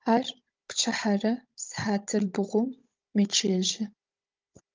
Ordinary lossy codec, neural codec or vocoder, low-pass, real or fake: Opus, 24 kbps; codec, 16 kHz, 16 kbps, FunCodec, trained on Chinese and English, 50 frames a second; 7.2 kHz; fake